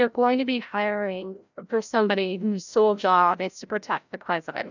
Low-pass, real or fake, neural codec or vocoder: 7.2 kHz; fake; codec, 16 kHz, 0.5 kbps, FreqCodec, larger model